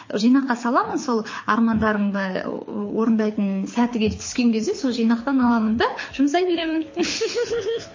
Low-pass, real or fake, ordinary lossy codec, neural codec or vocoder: 7.2 kHz; fake; MP3, 32 kbps; codec, 24 kHz, 6 kbps, HILCodec